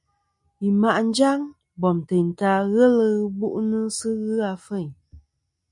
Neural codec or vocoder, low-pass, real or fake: none; 10.8 kHz; real